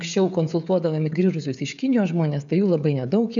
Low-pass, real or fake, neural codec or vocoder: 7.2 kHz; fake; codec, 16 kHz, 16 kbps, FreqCodec, smaller model